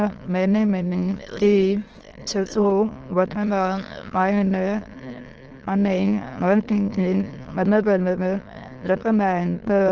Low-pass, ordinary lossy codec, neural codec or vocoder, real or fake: 7.2 kHz; Opus, 16 kbps; autoencoder, 22.05 kHz, a latent of 192 numbers a frame, VITS, trained on many speakers; fake